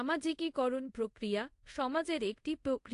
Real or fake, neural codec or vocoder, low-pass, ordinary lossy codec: fake; codec, 24 kHz, 0.5 kbps, DualCodec; 10.8 kHz; AAC, 48 kbps